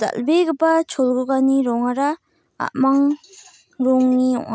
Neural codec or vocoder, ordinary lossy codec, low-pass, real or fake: none; none; none; real